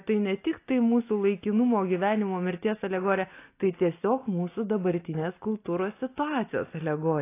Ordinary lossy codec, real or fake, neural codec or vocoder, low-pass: AAC, 24 kbps; real; none; 3.6 kHz